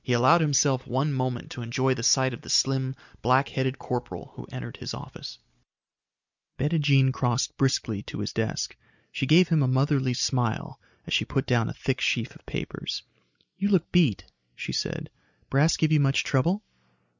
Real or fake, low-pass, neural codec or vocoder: real; 7.2 kHz; none